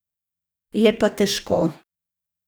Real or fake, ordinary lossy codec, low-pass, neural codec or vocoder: fake; none; none; codec, 44.1 kHz, 2.6 kbps, DAC